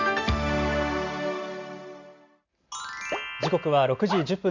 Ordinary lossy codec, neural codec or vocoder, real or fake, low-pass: Opus, 64 kbps; none; real; 7.2 kHz